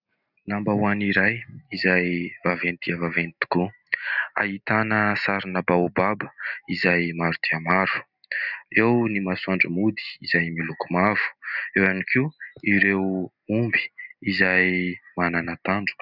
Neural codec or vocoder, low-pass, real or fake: none; 5.4 kHz; real